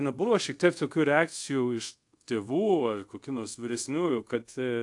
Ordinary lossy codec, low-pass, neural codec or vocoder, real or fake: AAC, 64 kbps; 10.8 kHz; codec, 24 kHz, 0.5 kbps, DualCodec; fake